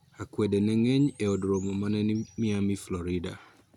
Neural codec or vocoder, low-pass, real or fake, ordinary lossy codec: none; 19.8 kHz; real; none